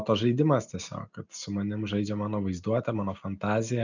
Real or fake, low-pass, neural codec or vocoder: real; 7.2 kHz; none